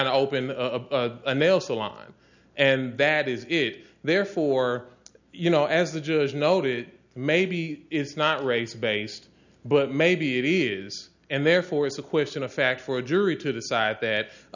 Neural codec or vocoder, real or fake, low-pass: none; real; 7.2 kHz